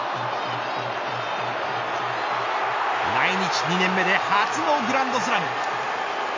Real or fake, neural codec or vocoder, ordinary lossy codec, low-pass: real; none; MP3, 64 kbps; 7.2 kHz